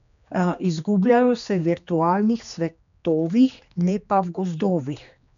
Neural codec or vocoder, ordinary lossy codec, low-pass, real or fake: codec, 16 kHz, 2 kbps, X-Codec, HuBERT features, trained on general audio; none; 7.2 kHz; fake